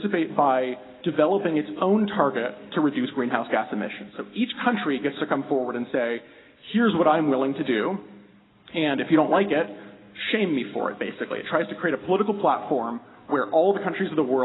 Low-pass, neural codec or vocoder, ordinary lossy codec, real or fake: 7.2 kHz; none; AAC, 16 kbps; real